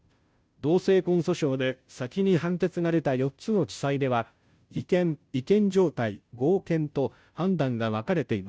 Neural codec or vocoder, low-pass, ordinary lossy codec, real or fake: codec, 16 kHz, 0.5 kbps, FunCodec, trained on Chinese and English, 25 frames a second; none; none; fake